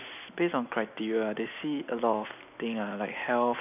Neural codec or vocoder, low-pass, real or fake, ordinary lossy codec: none; 3.6 kHz; real; none